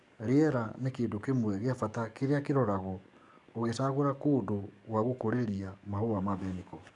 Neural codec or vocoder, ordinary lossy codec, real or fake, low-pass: codec, 44.1 kHz, 7.8 kbps, DAC; none; fake; 10.8 kHz